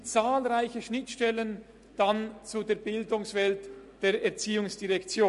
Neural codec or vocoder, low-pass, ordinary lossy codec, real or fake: none; 14.4 kHz; MP3, 48 kbps; real